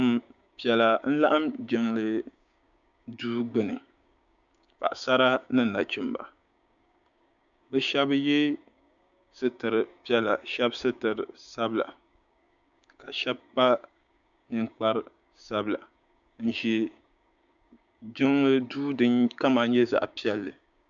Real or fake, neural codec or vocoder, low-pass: fake; codec, 16 kHz, 6 kbps, DAC; 7.2 kHz